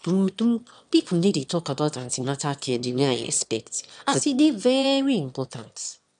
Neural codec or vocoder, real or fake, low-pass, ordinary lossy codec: autoencoder, 22.05 kHz, a latent of 192 numbers a frame, VITS, trained on one speaker; fake; 9.9 kHz; none